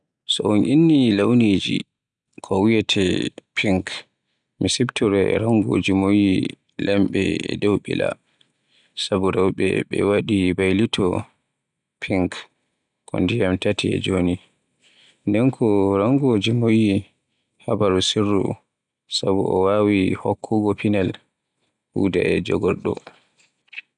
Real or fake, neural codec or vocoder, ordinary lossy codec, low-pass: real; none; none; 9.9 kHz